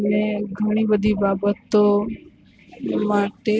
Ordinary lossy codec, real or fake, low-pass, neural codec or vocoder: none; real; none; none